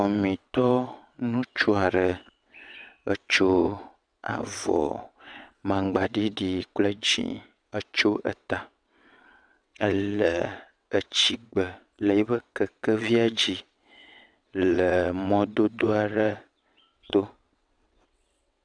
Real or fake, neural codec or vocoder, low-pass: fake; vocoder, 22.05 kHz, 80 mel bands, WaveNeXt; 9.9 kHz